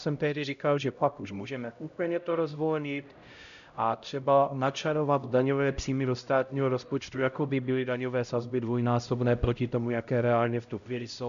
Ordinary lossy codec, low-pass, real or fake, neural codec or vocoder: MP3, 96 kbps; 7.2 kHz; fake; codec, 16 kHz, 0.5 kbps, X-Codec, HuBERT features, trained on LibriSpeech